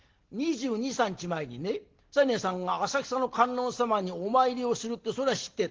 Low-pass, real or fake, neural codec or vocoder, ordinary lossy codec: 7.2 kHz; real; none; Opus, 16 kbps